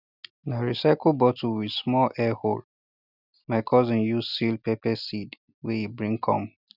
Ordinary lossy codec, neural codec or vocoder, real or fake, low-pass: none; none; real; 5.4 kHz